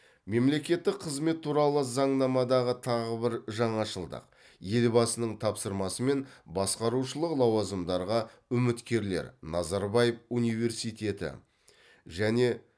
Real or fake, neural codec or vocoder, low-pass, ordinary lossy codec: real; none; none; none